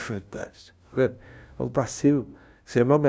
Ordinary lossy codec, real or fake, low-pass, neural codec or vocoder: none; fake; none; codec, 16 kHz, 0.5 kbps, FunCodec, trained on LibriTTS, 25 frames a second